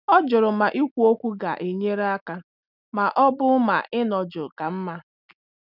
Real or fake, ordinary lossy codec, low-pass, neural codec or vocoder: real; none; 5.4 kHz; none